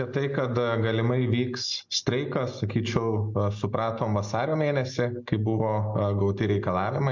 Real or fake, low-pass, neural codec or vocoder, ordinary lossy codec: real; 7.2 kHz; none; MP3, 64 kbps